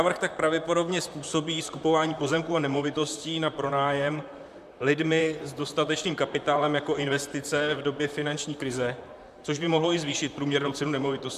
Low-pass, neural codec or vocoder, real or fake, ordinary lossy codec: 14.4 kHz; vocoder, 44.1 kHz, 128 mel bands, Pupu-Vocoder; fake; MP3, 96 kbps